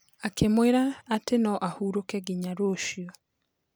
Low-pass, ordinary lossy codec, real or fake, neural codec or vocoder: none; none; real; none